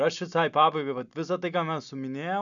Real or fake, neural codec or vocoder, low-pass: real; none; 7.2 kHz